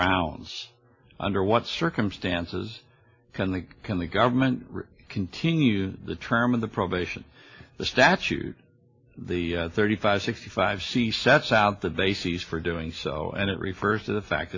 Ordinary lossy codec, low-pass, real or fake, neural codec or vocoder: AAC, 48 kbps; 7.2 kHz; real; none